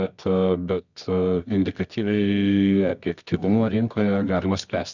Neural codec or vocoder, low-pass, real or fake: codec, 24 kHz, 0.9 kbps, WavTokenizer, medium music audio release; 7.2 kHz; fake